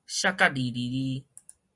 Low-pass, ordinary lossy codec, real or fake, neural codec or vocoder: 10.8 kHz; Opus, 64 kbps; real; none